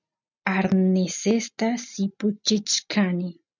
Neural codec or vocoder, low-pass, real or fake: none; 7.2 kHz; real